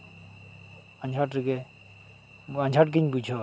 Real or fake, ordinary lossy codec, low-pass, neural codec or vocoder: real; none; none; none